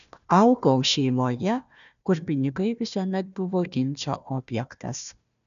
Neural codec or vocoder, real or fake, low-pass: codec, 16 kHz, 1 kbps, FunCodec, trained on Chinese and English, 50 frames a second; fake; 7.2 kHz